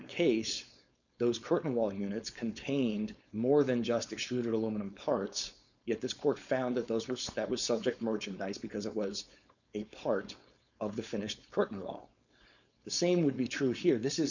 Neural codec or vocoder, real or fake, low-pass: codec, 16 kHz, 4.8 kbps, FACodec; fake; 7.2 kHz